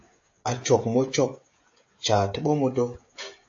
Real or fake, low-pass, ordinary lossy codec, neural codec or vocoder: fake; 7.2 kHz; AAC, 48 kbps; codec, 16 kHz, 16 kbps, FreqCodec, smaller model